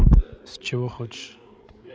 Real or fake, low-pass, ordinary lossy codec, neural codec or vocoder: fake; none; none; codec, 16 kHz, 16 kbps, FreqCodec, larger model